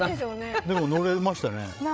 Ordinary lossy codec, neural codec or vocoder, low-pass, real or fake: none; codec, 16 kHz, 16 kbps, FreqCodec, larger model; none; fake